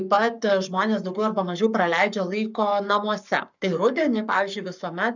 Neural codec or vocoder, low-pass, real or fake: codec, 44.1 kHz, 7.8 kbps, Pupu-Codec; 7.2 kHz; fake